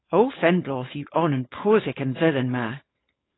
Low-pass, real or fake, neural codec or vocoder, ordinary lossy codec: 7.2 kHz; fake; codec, 16 kHz, 4.8 kbps, FACodec; AAC, 16 kbps